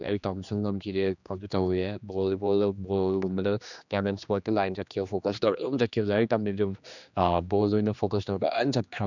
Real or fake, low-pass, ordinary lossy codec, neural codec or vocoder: fake; 7.2 kHz; none; codec, 16 kHz, 2 kbps, X-Codec, HuBERT features, trained on general audio